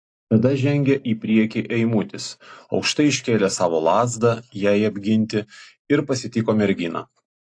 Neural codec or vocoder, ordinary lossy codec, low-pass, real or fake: none; AAC, 48 kbps; 9.9 kHz; real